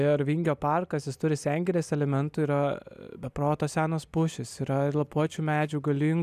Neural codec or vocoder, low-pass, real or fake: none; 14.4 kHz; real